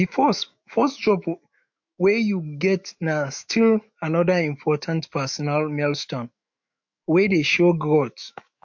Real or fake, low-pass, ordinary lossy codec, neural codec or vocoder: fake; 7.2 kHz; MP3, 48 kbps; autoencoder, 48 kHz, 128 numbers a frame, DAC-VAE, trained on Japanese speech